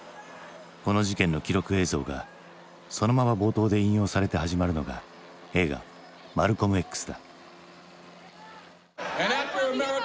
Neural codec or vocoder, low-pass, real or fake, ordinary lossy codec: none; none; real; none